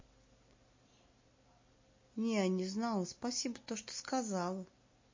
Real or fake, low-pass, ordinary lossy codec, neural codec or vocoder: real; 7.2 kHz; MP3, 32 kbps; none